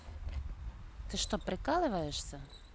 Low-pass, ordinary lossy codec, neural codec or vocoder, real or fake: none; none; none; real